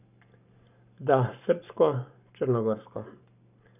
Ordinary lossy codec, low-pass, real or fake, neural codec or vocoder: none; 3.6 kHz; fake; codec, 44.1 kHz, 7.8 kbps, Pupu-Codec